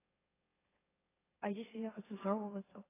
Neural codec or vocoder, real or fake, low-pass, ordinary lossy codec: autoencoder, 44.1 kHz, a latent of 192 numbers a frame, MeloTTS; fake; 3.6 kHz; AAC, 16 kbps